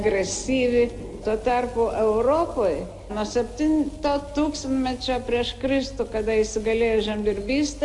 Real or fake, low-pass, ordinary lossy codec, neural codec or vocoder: real; 10.8 kHz; AAC, 64 kbps; none